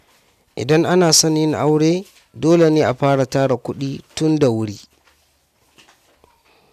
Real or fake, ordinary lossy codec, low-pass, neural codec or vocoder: real; none; 14.4 kHz; none